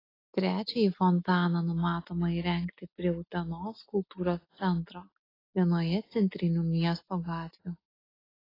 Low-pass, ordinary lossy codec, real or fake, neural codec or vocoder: 5.4 kHz; AAC, 24 kbps; real; none